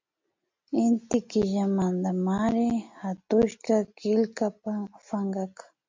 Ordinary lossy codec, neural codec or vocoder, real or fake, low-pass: MP3, 48 kbps; none; real; 7.2 kHz